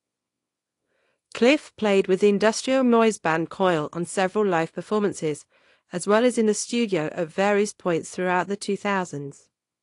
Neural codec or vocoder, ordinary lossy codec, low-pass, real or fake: codec, 24 kHz, 0.9 kbps, WavTokenizer, small release; AAC, 48 kbps; 10.8 kHz; fake